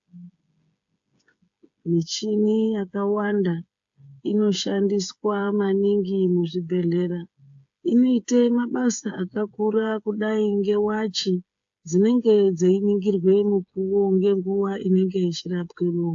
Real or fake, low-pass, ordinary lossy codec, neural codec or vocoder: fake; 7.2 kHz; AAC, 64 kbps; codec, 16 kHz, 8 kbps, FreqCodec, smaller model